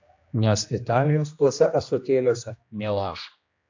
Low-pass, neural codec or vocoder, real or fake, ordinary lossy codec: 7.2 kHz; codec, 16 kHz, 1 kbps, X-Codec, HuBERT features, trained on general audio; fake; AAC, 48 kbps